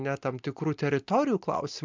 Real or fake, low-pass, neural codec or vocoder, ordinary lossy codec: real; 7.2 kHz; none; MP3, 64 kbps